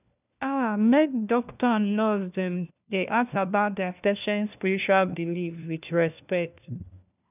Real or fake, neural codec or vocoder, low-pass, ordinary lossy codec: fake; codec, 16 kHz, 1 kbps, FunCodec, trained on LibriTTS, 50 frames a second; 3.6 kHz; none